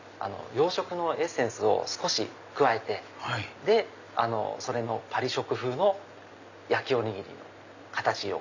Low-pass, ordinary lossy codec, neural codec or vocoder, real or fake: 7.2 kHz; none; none; real